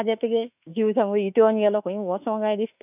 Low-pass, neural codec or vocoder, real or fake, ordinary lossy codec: 3.6 kHz; autoencoder, 48 kHz, 32 numbers a frame, DAC-VAE, trained on Japanese speech; fake; none